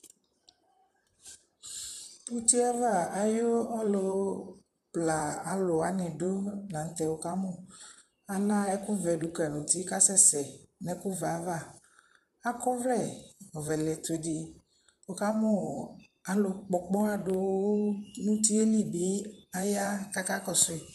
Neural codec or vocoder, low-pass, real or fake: vocoder, 44.1 kHz, 128 mel bands, Pupu-Vocoder; 14.4 kHz; fake